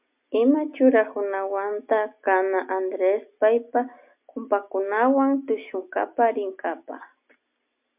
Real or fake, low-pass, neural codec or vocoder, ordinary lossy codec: real; 3.6 kHz; none; MP3, 32 kbps